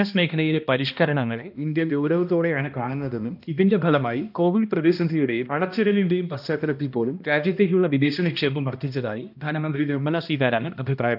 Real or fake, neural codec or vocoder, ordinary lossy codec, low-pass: fake; codec, 16 kHz, 1 kbps, X-Codec, HuBERT features, trained on balanced general audio; none; 5.4 kHz